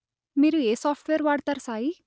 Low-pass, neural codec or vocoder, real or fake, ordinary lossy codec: none; none; real; none